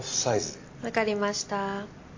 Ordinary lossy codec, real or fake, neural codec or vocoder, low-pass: AAC, 48 kbps; real; none; 7.2 kHz